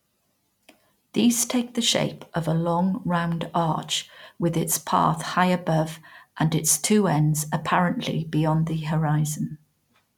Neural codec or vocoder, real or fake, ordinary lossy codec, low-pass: none; real; none; 19.8 kHz